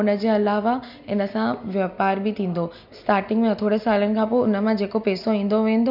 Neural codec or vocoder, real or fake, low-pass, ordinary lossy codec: none; real; 5.4 kHz; none